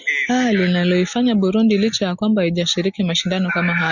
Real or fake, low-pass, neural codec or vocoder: real; 7.2 kHz; none